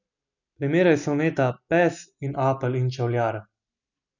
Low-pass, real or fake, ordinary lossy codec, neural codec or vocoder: 7.2 kHz; real; none; none